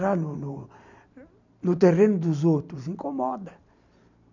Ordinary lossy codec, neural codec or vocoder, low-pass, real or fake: none; none; 7.2 kHz; real